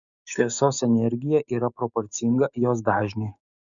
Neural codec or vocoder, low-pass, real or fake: codec, 16 kHz, 6 kbps, DAC; 7.2 kHz; fake